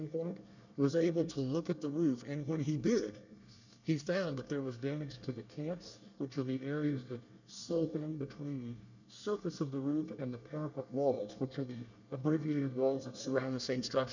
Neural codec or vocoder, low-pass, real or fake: codec, 24 kHz, 1 kbps, SNAC; 7.2 kHz; fake